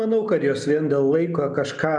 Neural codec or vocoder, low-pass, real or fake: none; 10.8 kHz; real